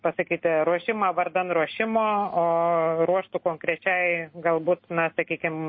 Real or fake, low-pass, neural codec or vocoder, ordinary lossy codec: real; 7.2 kHz; none; MP3, 24 kbps